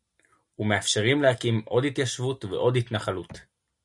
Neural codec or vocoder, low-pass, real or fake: none; 10.8 kHz; real